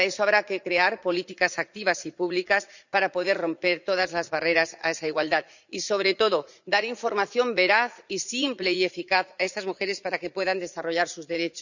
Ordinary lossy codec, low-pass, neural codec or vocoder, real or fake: none; 7.2 kHz; vocoder, 44.1 kHz, 80 mel bands, Vocos; fake